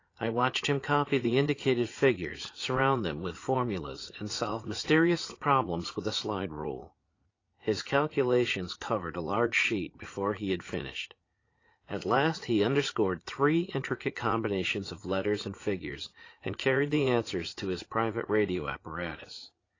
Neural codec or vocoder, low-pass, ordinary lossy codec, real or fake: vocoder, 44.1 kHz, 80 mel bands, Vocos; 7.2 kHz; AAC, 32 kbps; fake